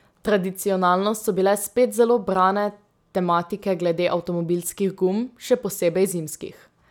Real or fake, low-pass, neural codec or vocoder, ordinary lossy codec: real; 19.8 kHz; none; none